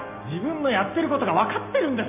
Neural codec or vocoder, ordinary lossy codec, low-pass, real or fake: none; none; 3.6 kHz; real